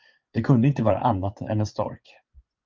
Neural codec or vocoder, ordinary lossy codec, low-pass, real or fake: vocoder, 24 kHz, 100 mel bands, Vocos; Opus, 24 kbps; 7.2 kHz; fake